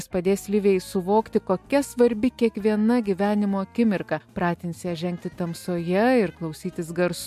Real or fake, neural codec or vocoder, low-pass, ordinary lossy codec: real; none; 14.4 kHz; MP3, 64 kbps